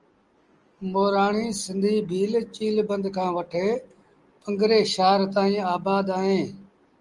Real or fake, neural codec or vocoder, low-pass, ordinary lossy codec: real; none; 10.8 kHz; Opus, 24 kbps